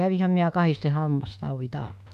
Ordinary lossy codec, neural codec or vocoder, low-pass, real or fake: none; autoencoder, 48 kHz, 32 numbers a frame, DAC-VAE, trained on Japanese speech; 14.4 kHz; fake